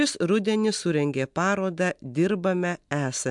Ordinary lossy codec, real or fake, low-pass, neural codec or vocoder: MP3, 64 kbps; real; 10.8 kHz; none